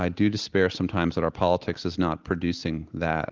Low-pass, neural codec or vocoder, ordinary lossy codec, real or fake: 7.2 kHz; none; Opus, 16 kbps; real